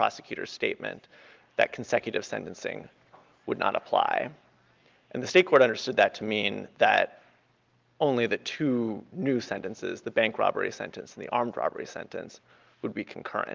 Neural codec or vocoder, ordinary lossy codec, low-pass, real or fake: none; Opus, 32 kbps; 7.2 kHz; real